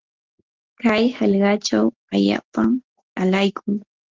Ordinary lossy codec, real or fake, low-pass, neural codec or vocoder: Opus, 16 kbps; real; 7.2 kHz; none